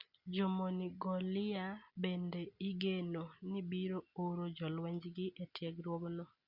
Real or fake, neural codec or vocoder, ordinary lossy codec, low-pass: real; none; Opus, 64 kbps; 5.4 kHz